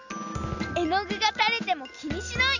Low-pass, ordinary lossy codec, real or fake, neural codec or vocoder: 7.2 kHz; none; real; none